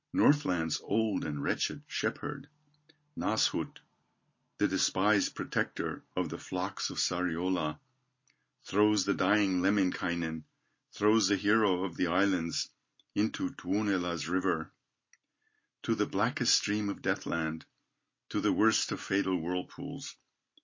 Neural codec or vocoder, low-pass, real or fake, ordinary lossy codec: none; 7.2 kHz; real; MP3, 32 kbps